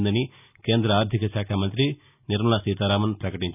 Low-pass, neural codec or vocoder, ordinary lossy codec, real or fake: 3.6 kHz; none; none; real